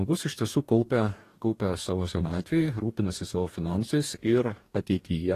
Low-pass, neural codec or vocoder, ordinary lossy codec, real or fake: 14.4 kHz; codec, 44.1 kHz, 2.6 kbps, DAC; AAC, 48 kbps; fake